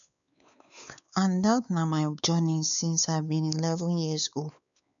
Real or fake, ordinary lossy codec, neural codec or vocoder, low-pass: fake; none; codec, 16 kHz, 4 kbps, X-Codec, WavLM features, trained on Multilingual LibriSpeech; 7.2 kHz